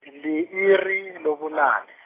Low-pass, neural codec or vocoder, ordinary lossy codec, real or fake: 3.6 kHz; none; AAC, 16 kbps; real